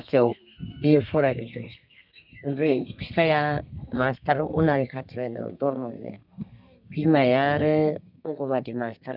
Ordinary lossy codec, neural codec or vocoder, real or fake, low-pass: none; codec, 32 kHz, 1.9 kbps, SNAC; fake; 5.4 kHz